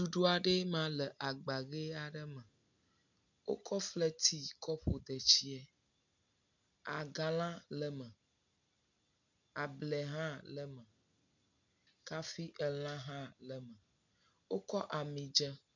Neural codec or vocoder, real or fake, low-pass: none; real; 7.2 kHz